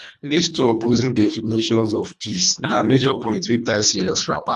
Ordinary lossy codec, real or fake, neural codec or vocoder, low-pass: none; fake; codec, 24 kHz, 1.5 kbps, HILCodec; none